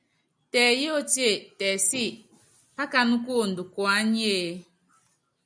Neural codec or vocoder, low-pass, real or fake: none; 9.9 kHz; real